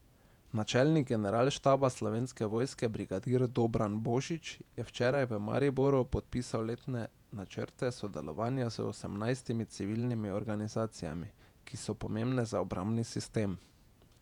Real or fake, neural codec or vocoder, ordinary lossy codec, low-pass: fake; vocoder, 48 kHz, 128 mel bands, Vocos; none; 19.8 kHz